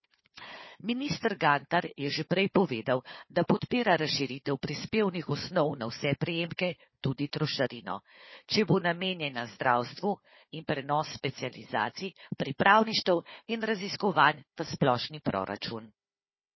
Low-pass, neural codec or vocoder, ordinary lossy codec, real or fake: 7.2 kHz; codec, 16 kHz, 4 kbps, FunCodec, trained on Chinese and English, 50 frames a second; MP3, 24 kbps; fake